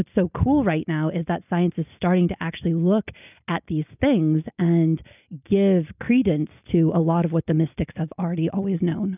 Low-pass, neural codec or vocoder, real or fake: 3.6 kHz; none; real